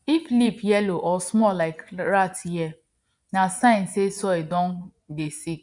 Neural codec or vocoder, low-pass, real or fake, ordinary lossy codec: vocoder, 24 kHz, 100 mel bands, Vocos; 10.8 kHz; fake; none